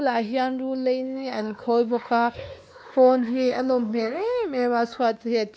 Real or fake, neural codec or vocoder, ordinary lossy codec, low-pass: fake; codec, 16 kHz, 2 kbps, X-Codec, WavLM features, trained on Multilingual LibriSpeech; none; none